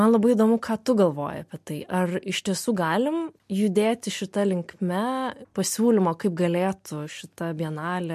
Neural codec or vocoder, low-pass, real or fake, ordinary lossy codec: none; 14.4 kHz; real; MP3, 64 kbps